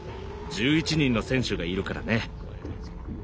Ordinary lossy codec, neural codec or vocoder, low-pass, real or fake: none; none; none; real